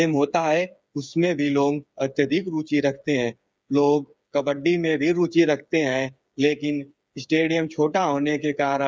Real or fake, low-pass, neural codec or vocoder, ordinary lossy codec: fake; none; codec, 16 kHz, 8 kbps, FreqCodec, smaller model; none